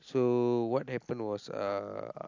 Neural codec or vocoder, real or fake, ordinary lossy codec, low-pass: none; real; AAC, 48 kbps; 7.2 kHz